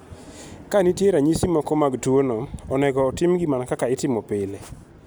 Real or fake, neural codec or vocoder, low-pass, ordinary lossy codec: real; none; none; none